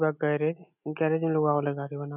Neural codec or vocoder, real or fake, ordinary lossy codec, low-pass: none; real; none; 3.6 kHz